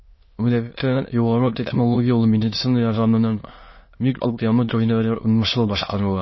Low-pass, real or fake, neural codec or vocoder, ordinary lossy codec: 7.2 kHz; fake; autoencoder, 22.05 kHz, a latent of 192 numbers a frame, VITS, trained on many speakers; MP3, 24 kbps